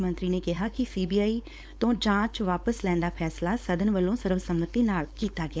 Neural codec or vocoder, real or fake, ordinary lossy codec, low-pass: codec, 16 kHz, 4.8 kbps, FACodec; fake; none; none